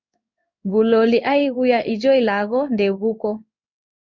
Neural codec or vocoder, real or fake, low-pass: codec, 16 kHz in and 24 kHz out, 1 kbps, XY-Tokenizer; fake; 7.2 kHz